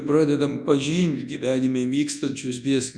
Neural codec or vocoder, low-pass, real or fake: codec, 24 kHz, 0.9 kbps, WavTokenizer, large speech release; 9.9 kHz; fake